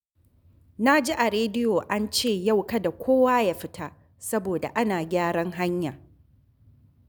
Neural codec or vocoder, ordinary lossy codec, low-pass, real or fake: none; none; none; real